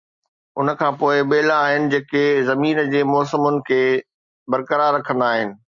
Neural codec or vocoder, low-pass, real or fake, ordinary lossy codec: none; 7.2 kHz; real; Opus, 64 kbps